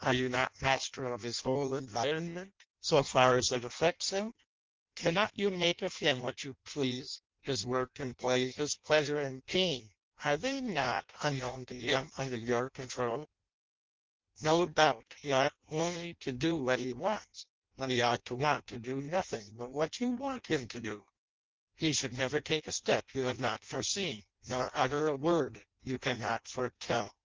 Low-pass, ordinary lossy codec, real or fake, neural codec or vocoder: 7.2 kHz; Opus, 32 kbps; fake; codec, 16 kHz in and 24 kHz out, 0.6 kbps, FireRedTTS-2 codec